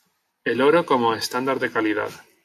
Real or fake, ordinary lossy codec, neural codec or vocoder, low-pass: real; AAC, 64 kbps; none; 14.4 kHz